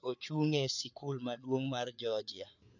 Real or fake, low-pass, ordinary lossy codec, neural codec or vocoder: fake; 7.2 kHz; none; codec, 16 kHz, 4 kbps, FreqCodec, larger model